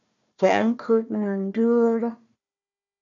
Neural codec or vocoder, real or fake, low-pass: codec, 16 kHz, 1 kbps, FunCodec, trained on Chinese and English, 50 frames a second; fake; 7.2 kHz